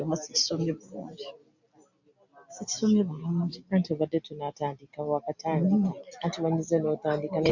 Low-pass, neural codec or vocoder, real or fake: 7.2 kHz; none; real